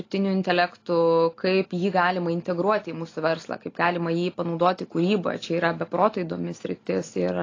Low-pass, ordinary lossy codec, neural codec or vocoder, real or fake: 7.2 kHz; AAC, 32 kbps; none; real